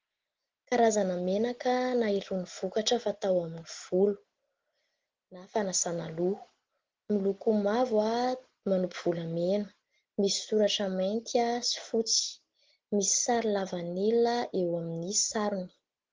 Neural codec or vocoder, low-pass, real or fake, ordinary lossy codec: none; 7.2 kHz; real; Opus, 16 kbps